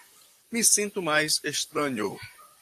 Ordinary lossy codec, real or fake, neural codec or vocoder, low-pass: AAC, 64 kbps; fake; vocoder, 44.1 kHz, 128 mel bands, Pupu-Vocoder; 14.4 kHz